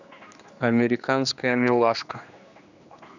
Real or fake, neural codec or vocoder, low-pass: fake; codec, 16 kHz, 2 kbps, X-Codec, HuBERT features, trained on general audio; 7.2 kHz